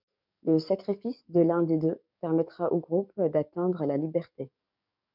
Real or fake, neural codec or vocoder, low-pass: fake; codec, 44.1 kHz, 7.8 kbps, DAC; 5.4 kHz